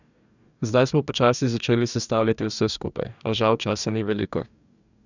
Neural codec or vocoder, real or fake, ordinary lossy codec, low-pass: codec, 44.1 kHz, 2.6 kbps, DAC; fake; none; 7.2 kHz